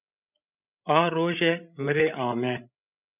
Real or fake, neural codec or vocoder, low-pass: fake; codec, 16 kHz, 16 kbps, FreqCodec, larger model; 3.6 kHz